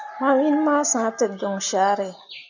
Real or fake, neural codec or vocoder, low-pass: real; none; 7.2 kHz